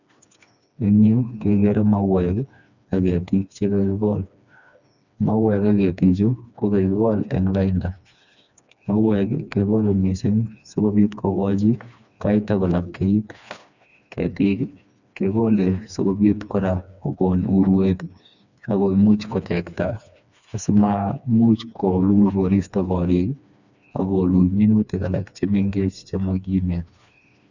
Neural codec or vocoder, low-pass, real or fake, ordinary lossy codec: codec, 16 kHz, 2 kbps, FreqCodec, smaller model; 7.2 kHz; fake; none